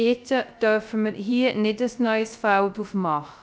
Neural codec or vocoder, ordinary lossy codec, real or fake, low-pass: codec, 16 kHz, 0.3 kbps, FocalCodec; none; fake; none